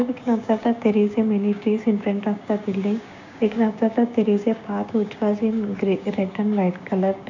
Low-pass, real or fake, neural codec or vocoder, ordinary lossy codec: 7.2 kHz; fake; codec, 16 kHz, 6 kbps, DAC; none